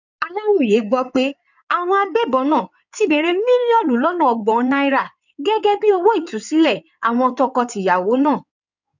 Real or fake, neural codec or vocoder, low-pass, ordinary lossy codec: fake; codec, 16 kHz in and 24 kHz out, 2.2 kbps, FireRedTTS-2 codec; 7.2 kHz; none